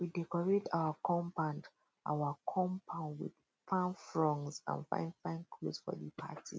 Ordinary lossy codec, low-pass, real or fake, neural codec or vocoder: none; none; real; none